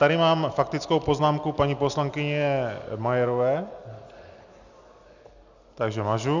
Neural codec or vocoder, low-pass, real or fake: none; 7.2 kHz; real